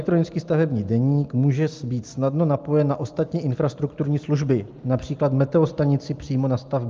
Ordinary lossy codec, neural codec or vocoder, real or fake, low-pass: Opus, 24 kbps; none; real; 7.2 kHz